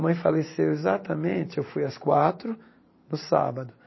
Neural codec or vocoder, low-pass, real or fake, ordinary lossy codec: vocoder, 44.1 kHz, 128 mel bands every 512 samples, BigVGAN v2; 7.2 kHz; fake; MP3, 24 kbps